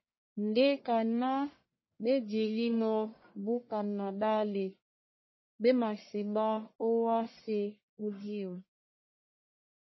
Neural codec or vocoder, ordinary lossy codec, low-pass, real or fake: codec, 44.1 kHz, 1.7 kbps, Pupu-Codec; MP3, 24 kbps; 7.2 kHz; fake